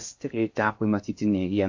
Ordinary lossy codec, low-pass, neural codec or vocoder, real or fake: AAC, 48 kbps; 7.2 kHz; codec, 16 kHz in and 24 kHz out, 0.6 kbps, FocalCodec, streaming, 2048 codes; fake